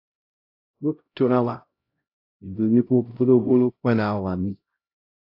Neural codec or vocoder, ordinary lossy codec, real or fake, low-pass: codec, 16 kHz, 0.5 kbps, X-Codec, HuBERT features, trained on LibriSpeech; AAC, 32 kbps; fake; 5.4 kHz